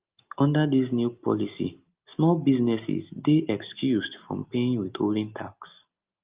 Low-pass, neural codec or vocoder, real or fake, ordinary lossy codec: 3.6 kHz; none; real; Opus, 24 kbps